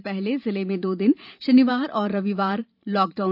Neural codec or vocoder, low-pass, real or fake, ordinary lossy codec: vocoder, 44.1 kHz, 128 mel bands every 512 samples, BigVGAN v2; 5.4 kHz; fake; none